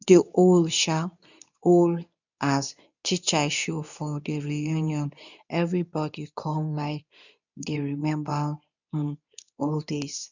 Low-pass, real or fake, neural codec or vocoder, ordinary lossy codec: 7.2 kHz; fake; codec, 24 kHz, 0.9 kbps, WavTokenizer, medium speech release version 2; none